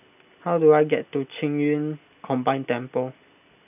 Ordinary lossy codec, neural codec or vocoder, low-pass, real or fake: none; none; 3.6 kHz; real